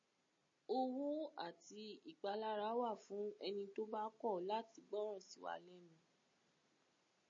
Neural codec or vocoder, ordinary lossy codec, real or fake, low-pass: none; MP3, 32 kbps; real; 7.2 kHz